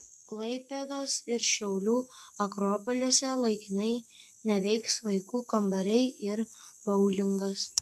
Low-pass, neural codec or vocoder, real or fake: 14.4 kHz; codec, 44.1 kHz, 2.6 kbps, SNAC; fake